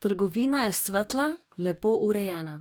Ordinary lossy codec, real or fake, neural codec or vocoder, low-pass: none; fake; codec, 44.1 kHz, 2.6 kbps, DAC; none